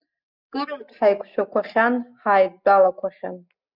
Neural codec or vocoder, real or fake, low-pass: none; real; 5.4 kHz